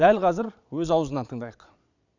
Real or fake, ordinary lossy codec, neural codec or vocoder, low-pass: fake; none; vocoder, 22.05 kHz, 80 mel bands, Vocos; 7.2 kHz